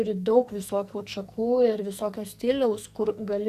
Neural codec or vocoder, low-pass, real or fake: codec, 44.1 kHz, 2.6 kbps, SNAC; 14.4 kHz; fake